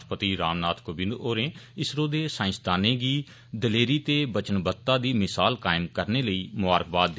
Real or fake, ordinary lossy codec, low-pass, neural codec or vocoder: real; none; none; none